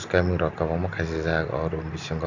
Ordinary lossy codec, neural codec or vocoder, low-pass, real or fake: none; none; 7.2 kHz; real